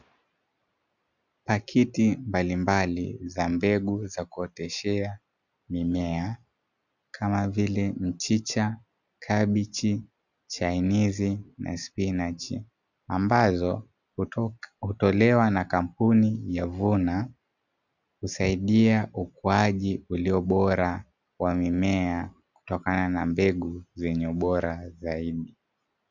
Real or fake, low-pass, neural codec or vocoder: real; 7.2 kHz; none